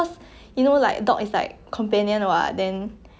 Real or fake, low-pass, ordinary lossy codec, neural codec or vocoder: real; none; none; none